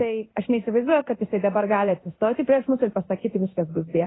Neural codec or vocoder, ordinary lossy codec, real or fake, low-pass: codec, 16 kHz in and 24 kHz out, 1 kbps, XY-Tokenizer; AAC, 16 kbps; fake; 7.2 kHz